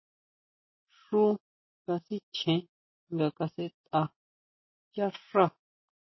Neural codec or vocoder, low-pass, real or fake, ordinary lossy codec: none; 7.2 kHz; real; MP3, 24 kbps